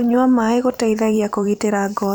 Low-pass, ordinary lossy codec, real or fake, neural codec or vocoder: none; none; fake; vocoder, 44.1 kHz, 128 mel bands every 512 samples, BigVGAN v2